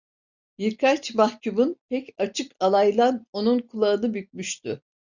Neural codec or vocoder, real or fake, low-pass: none; real; 7.2 kHz